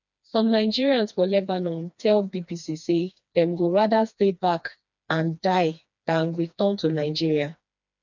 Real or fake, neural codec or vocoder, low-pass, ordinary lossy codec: fake; codec, 16 kHz, 2 kbps, FreqCodec, smaller model; 7.2 kHz; none